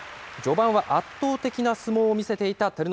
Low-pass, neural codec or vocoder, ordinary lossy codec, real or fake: none; none; none; real